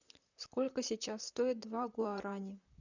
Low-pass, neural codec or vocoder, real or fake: 7.2 kHz; vocoder, 44.1 kHz, 128 mel bands, Pupu-Vocoder; fake